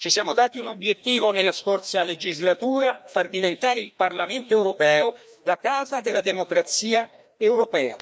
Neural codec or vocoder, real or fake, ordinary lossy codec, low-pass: codec, 16 kHz, 1 kbps, FreqCodec, larger model; fake; none; none